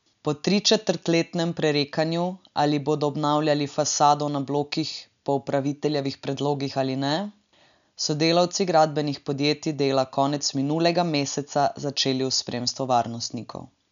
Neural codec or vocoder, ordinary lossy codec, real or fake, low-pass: none; none; real; 7.2 kHz